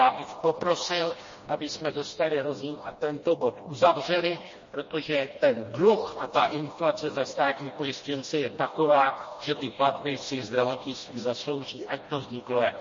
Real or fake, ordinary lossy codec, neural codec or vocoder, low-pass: fake; MP3, 32 kbps; codec, 16 kHz, 1 kbps, FreqCodec, smaller model; 7.2 kHz